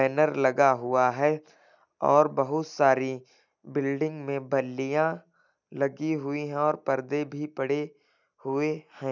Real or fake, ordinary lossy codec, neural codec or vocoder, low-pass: real; none; none; 7.2 kHz